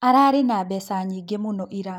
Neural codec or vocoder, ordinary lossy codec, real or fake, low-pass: none; none; real; 19.8 kHz